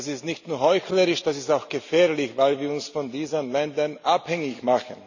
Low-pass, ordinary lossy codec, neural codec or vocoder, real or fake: 7.2 kHz; none; none; real